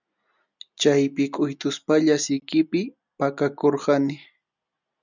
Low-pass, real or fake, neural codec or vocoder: 7.2 kHz; real; none